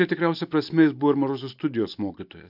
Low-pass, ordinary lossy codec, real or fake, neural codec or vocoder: 5.4 kHz; AAC, 48 kbps; real; none